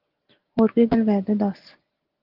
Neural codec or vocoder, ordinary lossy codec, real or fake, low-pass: none; Opus, 16 kbps; real; 5.4 kHz